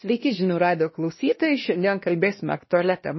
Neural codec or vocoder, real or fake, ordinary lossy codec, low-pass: codec, 16 kHz, 1 kbps, X-Codec, WavLM features, trained on Multilingual LibriSpeech; fake; MP3, 24 kbps; 7.2 kHz